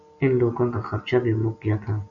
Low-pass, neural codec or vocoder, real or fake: 7.2 kHz; none; real